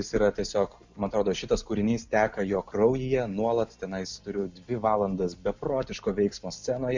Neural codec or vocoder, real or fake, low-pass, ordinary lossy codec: none; real; 7.2 kHz; MP3, 64 kbps